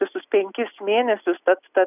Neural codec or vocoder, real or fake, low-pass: none; real; 3.6 kHz